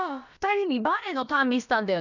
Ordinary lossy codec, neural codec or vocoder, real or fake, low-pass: none; codec, 16 kHz, about 1 kbps, DyCAST, with the encoder's durations; fake; 7.2 kHz